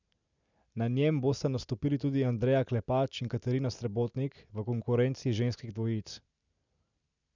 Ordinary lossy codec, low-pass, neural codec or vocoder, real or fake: none; 7.2 kHz; none; real